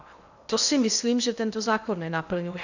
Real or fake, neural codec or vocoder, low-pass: fake; codec, 16 kHz in and 24 kHz out, 0.8 kbps, FocalCodec, streaming, 65536 codes; 7.2 kHz